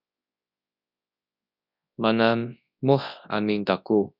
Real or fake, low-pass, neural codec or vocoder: fake; 5.4 kHz; codec, 24 kHz, 0.9 kbps, WavTokenizer, large speech release